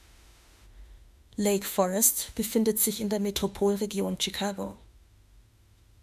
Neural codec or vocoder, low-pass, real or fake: autoencoder, 48 kHz, 32 numbers a frame, DAC-VAE, trained on Japanese speech; 14.4 kHz; fake